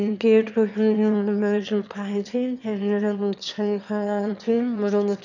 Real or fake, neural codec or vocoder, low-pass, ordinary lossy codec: fake; autoencoder, 22.05 kHz, a latent of 192 numbers a frame, VITS, trained on one speaker; 7.2 kHz; none